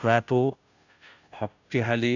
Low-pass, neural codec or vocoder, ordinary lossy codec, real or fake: 7.2 kHz; codec, 16 kHz, 0.5 kbps, FunCodec, trained on Chinese and English, 25 frames a second; none; fake